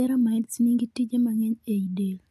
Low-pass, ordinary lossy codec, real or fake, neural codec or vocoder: 14.4 kHz; none; real; none